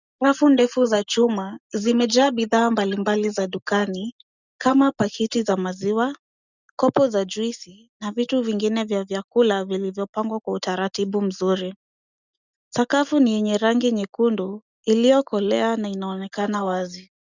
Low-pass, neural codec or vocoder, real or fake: 7.2 kHz; none; real